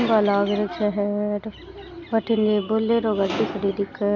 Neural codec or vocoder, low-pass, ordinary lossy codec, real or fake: none; 7.2 kHz; AAC, 48 kbps; real